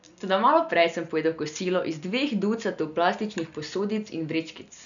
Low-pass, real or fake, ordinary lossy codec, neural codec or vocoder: 7.2 kHz; real; none; none